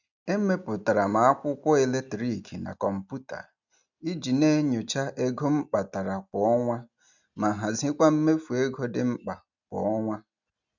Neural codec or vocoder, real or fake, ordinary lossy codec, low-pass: none; real; none; 7.2 kHz